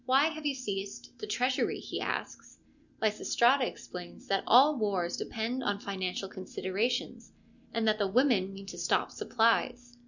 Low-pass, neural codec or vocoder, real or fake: 7.2 kHz; none; real